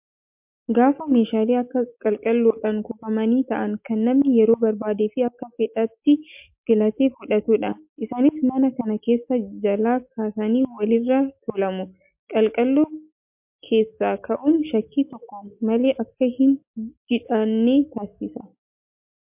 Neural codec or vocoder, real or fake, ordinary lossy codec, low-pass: none; real; MP3, 32 kbps; 3.6 kHz